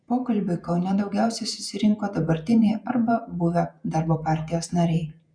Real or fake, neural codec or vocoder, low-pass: fake; vocoder, 48 kHz, 128 mel bands, Vocos; 9.9 kHz